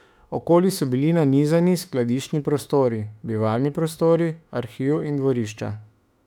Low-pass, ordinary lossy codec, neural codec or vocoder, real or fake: 19.8 kHz; none; autoencoder, 48 kHz, 32 numbers a frame, DAC-VAE, trained on Japanese speech; fake